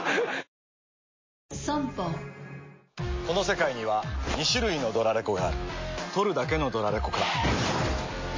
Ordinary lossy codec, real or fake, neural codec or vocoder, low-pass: MP3, 32 kbps; real; none; 7.2 kHz